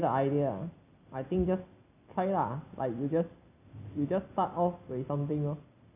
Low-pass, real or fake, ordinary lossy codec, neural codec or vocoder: 3.6 kHz; real; none; none